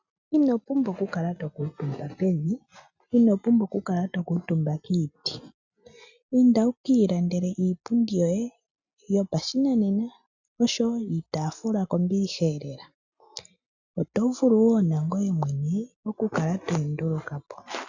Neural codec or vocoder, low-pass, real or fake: none; 7.2 kHz; real